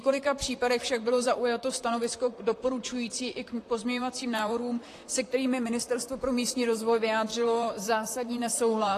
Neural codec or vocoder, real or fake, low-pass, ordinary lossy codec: vocoder, 44.1 kHz, 128 mel bands, Pupu-Vocoder; fake; 14.4 kHz; AAC, 48 kbps